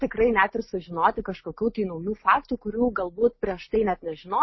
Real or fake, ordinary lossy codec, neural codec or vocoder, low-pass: real; MP3, 24 kbps; none; 7.2 kHz